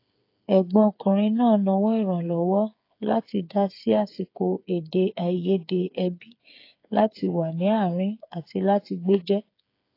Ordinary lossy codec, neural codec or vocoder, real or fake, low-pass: AAC, 32 kbps; codec, 16 kHz, 8 kbps, FreqCodec, smaller model; fake; 5.4 kHz